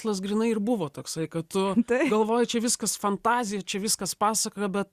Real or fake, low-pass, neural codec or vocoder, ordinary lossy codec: real; 14.4 kHz; none; Opus, 64 kbps